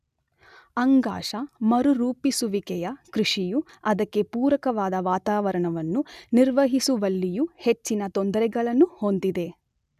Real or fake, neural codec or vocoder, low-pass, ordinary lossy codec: real; none; 14.4 kHz; none